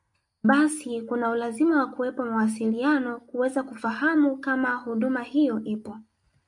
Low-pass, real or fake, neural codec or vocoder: 10.8 kHz; real; none